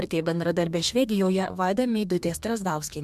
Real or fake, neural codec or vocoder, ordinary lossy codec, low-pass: fake; codec, 32 kHz, 1.9 kbps, SNAC; MP3, 96 kbps; 14.4 kHz